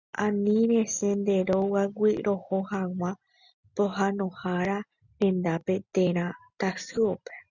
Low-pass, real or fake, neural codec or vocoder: 7.2 kHz; real; none